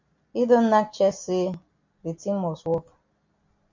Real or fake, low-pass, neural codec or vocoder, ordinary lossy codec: real; 7.2 kHz; none; MP3, 48 kbps